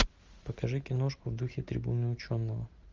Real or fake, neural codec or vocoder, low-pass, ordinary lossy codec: real; none; 7.2 kHz; Opus, 32 kbps